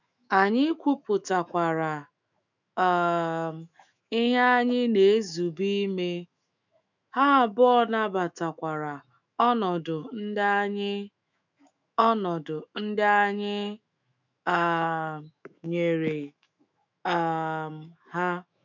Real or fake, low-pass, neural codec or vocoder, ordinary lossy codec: fake; 7.2 kHz; autoencoder, 48 kHz, 128 numbers a frame, DAC-VAE, trained on Japanese speech; none